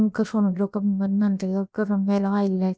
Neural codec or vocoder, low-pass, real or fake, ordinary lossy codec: codec, 16 kHz, about 1 kbps, DyCAST, with the encoder's durations; none; fake; none